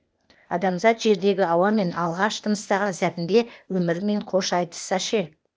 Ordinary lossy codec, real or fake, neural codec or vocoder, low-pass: none; fake; codec, 16 kHz, 0.8 kbps, ZipCodec; none